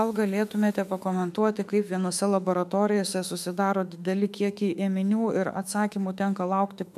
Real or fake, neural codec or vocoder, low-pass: fake; autoencoder, 48 kHz, 32 numbers a frame, DAC-VAE, trained on Japanese speech; 14.4 kHz